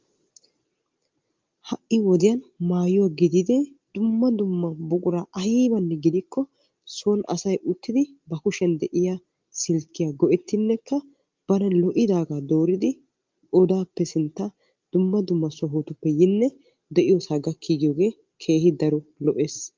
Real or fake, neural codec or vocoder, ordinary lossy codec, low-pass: real; none; Opus, 32 kbps; 7.2 kHz